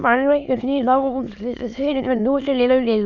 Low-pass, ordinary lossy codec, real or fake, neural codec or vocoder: 7.2 kHz; none; fake; autoencoder, 22.05 kHz, a latent of 192 numbers a frame, VITS, trained on many speakers